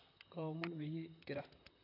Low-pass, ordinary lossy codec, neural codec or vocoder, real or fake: 5.4 kHz; AAC, 32 kbps; vocoder, 44.1 kHz, 128 mel bands, Pupu-Vocoder; fake